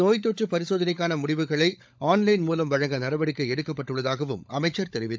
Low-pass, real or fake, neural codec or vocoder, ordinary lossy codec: none; fake; codec, 16 kHz, 16 kbps, FunCodec, trained on LibriTTS, 50 frames a second; none